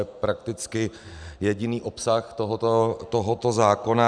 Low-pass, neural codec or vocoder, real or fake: 9.9 kHz; none; real